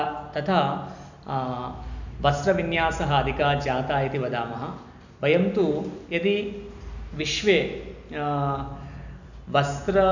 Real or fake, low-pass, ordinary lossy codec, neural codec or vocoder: real; 7.2 kHz; none; none